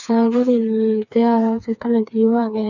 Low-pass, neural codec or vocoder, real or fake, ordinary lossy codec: 7.2 kHz; codec, 16 kHz, 4 kbps, FreqCodec, smaller model; fake; none